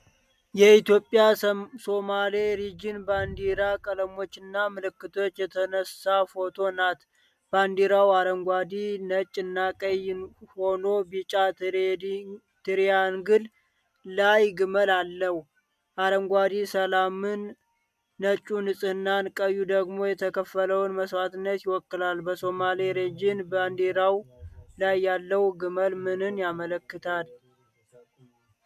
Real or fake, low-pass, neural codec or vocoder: real; 14.4 kHz; none